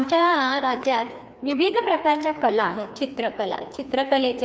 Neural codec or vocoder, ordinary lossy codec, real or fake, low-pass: codec, 16 kHz, 2 kbps, FreqCodec, larger model; none; fake; none